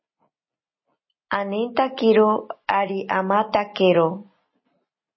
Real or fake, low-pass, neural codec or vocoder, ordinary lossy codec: real; 7.2 kHz; none; MP3, 24 kbps